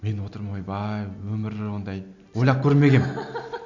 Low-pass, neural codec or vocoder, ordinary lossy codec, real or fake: 7.2 kHz; none; none; real